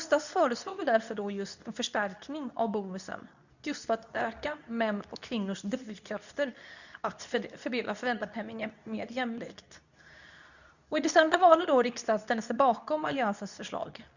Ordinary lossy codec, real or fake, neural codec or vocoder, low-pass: none; fake; codec, 24 kHz, 0.9 kbps, WavTokenizer, medium speech release version 2; 7.2 kHz